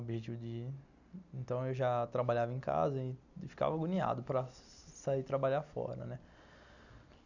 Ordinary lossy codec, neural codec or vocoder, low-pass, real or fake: none; none; 7.2 kHz; real